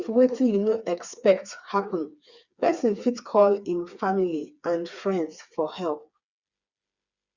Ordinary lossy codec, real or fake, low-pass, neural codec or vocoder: Opus, 64 kbps; fake; 7.2 kHz; codec, 16 kHz, 4 kbps, FreqCodec, smaller model